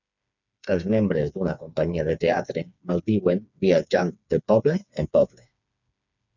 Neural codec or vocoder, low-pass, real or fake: codec, 16 kHz, 4 kbps, FreqCodec, smaller model; 7.2 kHz; fake